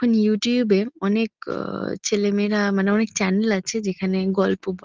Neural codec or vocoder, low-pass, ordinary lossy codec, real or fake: none; 7.2 kHz; Opus, 16 kbps; real